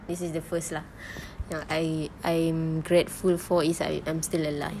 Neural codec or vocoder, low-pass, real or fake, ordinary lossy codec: none; 14.4 kHz; real; none